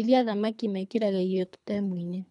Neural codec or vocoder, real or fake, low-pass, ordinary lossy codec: codec, 24 kHz, 3 kbps, HILCodec; fake; 10.8 kHz; none